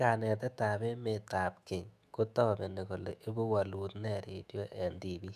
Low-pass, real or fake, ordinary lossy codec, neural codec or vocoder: 14.4 kHz; fake; none; autoencoder, 48 kHz, 128 numbers a frame, DAC-VAE, trained on Japanese speech